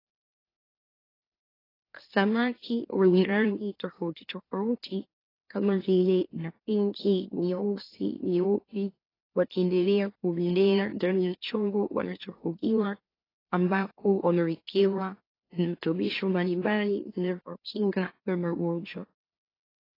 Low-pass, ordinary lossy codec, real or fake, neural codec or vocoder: 5.4 kHz; AAC, 24 kbps; fake; autoencoder, 44.1 kHz, a latent of 192 numbers a frame, MeloTTS